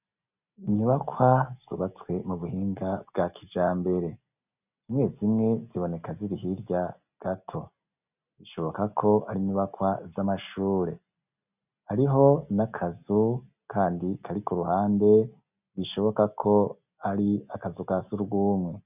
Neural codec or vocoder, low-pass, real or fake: none; 3.6 kHz; real